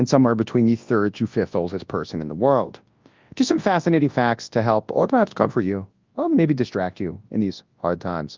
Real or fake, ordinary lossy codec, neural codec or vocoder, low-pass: fake; Opus, 24 kbps; codec, 24 kHz, 0.9 kbps, WavTokenizer, large speech release; 7.2 kHz